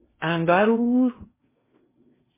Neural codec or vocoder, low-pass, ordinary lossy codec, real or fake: codec, 16 kHz in and 24 kHz out, 0.8 kbps, FocalCodec, streaming, 65536 codes; 3.6 kHz; MP3, 16 kbps; fake